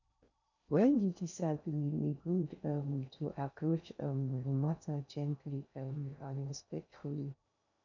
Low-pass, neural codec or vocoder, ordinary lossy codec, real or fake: 7.2 kHz; codec, 16 kHz in and 24 kHz out, 0.6 kbps, FocalCodec, streaming, 2048 codes; none; fake